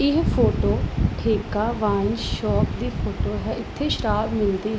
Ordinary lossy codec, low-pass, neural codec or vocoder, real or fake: none; none; none; real